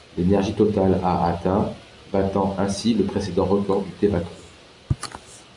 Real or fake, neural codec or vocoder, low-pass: real; none; 10.8 kHz